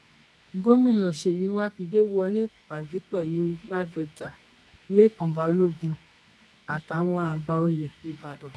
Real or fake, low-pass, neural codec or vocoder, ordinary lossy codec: fake; none; codec, 24 kHz, 0.9 kbps, WavTokenizer, medium music audio release; none